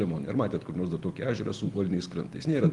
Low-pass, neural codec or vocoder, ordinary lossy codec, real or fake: 10.8 kHz; none; Opus, 24 kbps; real